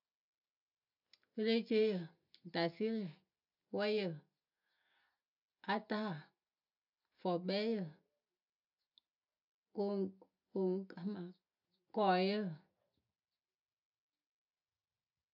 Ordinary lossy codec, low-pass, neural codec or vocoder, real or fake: none; 5.4 kHz; none; real